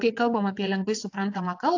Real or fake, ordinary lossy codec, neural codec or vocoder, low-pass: fake; AAC, 48 kbps; vocoder, 22.05 kHz, 80 mel bands, WaveNeXt; 7.2 kHz